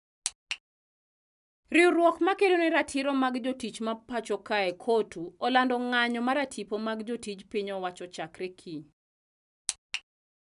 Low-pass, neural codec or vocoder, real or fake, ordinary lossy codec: 10.8 kHz; none; real; none